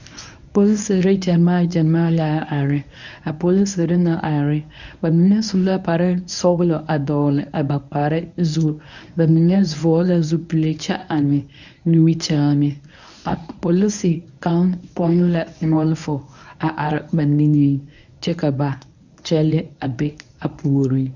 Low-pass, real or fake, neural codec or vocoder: 7.2 kHz; fake; codec, 24 kHz, 0.9 kbps, WavTokenizer, medium speech release version 1